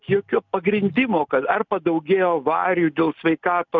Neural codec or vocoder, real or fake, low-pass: none; real; 7.2 kHz